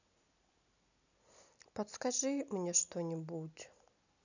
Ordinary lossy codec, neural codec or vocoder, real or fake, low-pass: none; none; real; 7.2 kHz